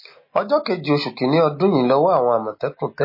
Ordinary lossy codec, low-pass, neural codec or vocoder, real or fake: MP3, 24 kbps; 5.4 kHz; none; real